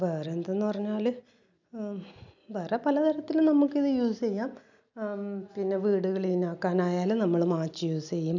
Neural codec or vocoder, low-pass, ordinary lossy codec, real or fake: none; 7.2 kHz; none; real